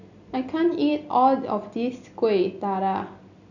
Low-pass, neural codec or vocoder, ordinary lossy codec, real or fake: 7.2 kHz; none; none; real